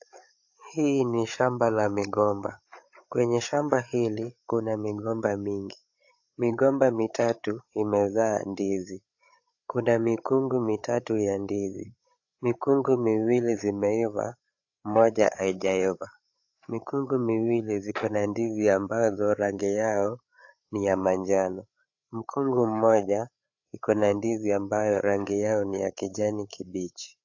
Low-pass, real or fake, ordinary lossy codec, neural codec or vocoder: 7.2 kHz; fake; AAC, 48 kbps; codec, 16 kHz, 8 kbps, FreqCodec, larger model